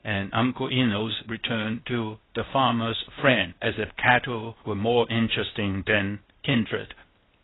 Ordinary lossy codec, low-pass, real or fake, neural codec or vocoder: AAC, 16 kbps; 7.2 kHz; fake; codec, 16 kHz, 0.8 kbps, ZipCodec